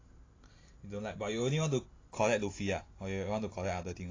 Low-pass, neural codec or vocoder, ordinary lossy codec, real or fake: 7.2 kHz; none; AAC, 32 kbps; real